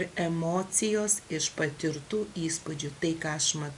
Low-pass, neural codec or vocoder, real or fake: 10.8 kHz; none; real